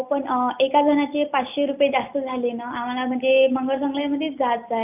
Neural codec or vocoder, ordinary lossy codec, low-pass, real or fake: none; none; 3.6 kHz; real